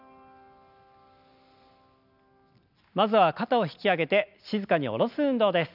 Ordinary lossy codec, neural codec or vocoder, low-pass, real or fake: none; none; 5.4 kHz; real